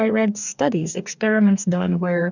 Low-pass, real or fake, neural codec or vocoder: 7.2 kHz; fake; codec, 44.1 kHz, 2.6 kbps, DAC